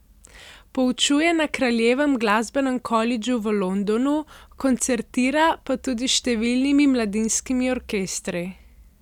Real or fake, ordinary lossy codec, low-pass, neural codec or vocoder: real; none; 19.8 kHz; none